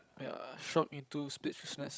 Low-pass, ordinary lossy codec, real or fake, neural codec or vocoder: none; none; fake; codec, 16 kHz, 16 kbps, FreqCodec, larger model